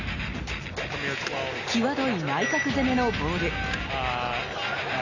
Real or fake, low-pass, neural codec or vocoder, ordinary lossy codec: real; 7.2 kHz; none; none